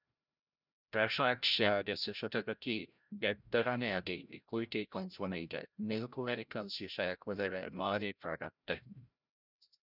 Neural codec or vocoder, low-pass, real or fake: codec, 16 kHz, 0.5 kbps, FreqCodec, larger model; 5.4 kHz; fake